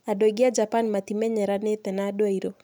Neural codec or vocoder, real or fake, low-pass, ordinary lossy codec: none; real; none; none